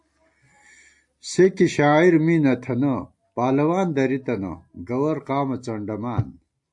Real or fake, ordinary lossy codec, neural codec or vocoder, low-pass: real; AAC, 64 kbps; none; 10.8 kHz